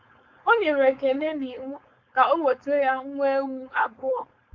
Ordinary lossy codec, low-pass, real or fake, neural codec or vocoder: Opus, 64 kbps; 7.2 kHz; fake; codec, 16 kHz, 4.8 kbps, FACodec